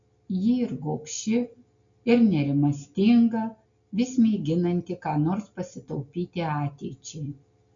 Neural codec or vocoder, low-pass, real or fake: none; 7.2 kHz; real